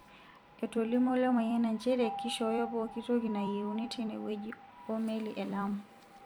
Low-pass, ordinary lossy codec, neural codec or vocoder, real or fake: 19.8 kHz; none; vocoder, 44.1 kHz, 128 mel bands every 256 samples, BigVGAN v2; fake